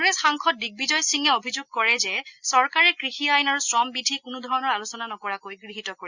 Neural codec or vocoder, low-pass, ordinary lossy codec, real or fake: none; 7.2 kHz; Opus, 64 kbps; real